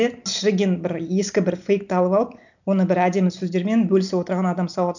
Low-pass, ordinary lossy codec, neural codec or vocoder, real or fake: 7.2 kHz; none; none; real